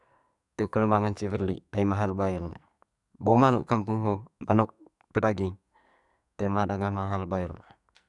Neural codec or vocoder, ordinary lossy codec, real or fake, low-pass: codec, 44.1 kHz, 2.6 kbps, SNAC; none; fake; 10.8 kHz